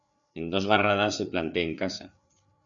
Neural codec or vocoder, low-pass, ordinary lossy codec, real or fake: codec, 16 kHz, 4 kbps, FreqCodec, larger model; 7.2 kHz; MP3, 96 kbps; fake